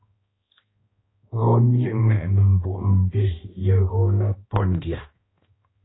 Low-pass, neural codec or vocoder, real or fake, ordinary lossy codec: 7.2 kHz; codec, 16 kHz, 1 kbps, X-Codec, HuBERT features, trained on balanced general audio; fake; AAC, 16 kbps